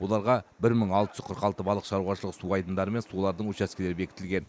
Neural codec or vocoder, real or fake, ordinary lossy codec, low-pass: none; real; none; none